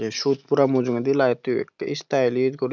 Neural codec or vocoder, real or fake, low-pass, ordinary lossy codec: none; real; 7.2 kHz; none